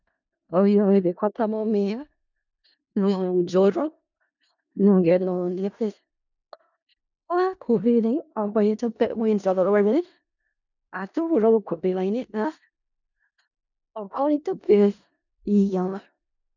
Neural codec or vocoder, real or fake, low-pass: codec, 16 kHz in and 24 kHz out, 0.4 kbps, LongCat-Audio-Codec, four codebook decoder; fake; 7.2 kHz